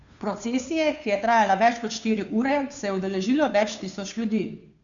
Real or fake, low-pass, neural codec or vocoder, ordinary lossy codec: fake; 7.2 kHz; codec, 16 kHz, 2 kbps, FunCodec, trained on Chinese and English, 25 frames a second; none